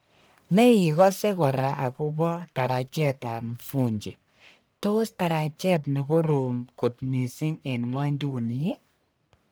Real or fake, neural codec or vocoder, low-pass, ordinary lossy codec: fake; codec, 44.1 kHz, 1.7 kbps, Pupu-Codec; none; none